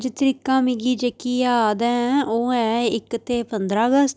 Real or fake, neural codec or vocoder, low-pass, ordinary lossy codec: real; none; none; none